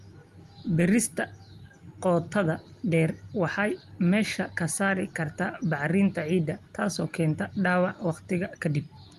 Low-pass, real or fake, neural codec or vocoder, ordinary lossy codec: 14.4 kHz; real; none; Opus, 32 kbps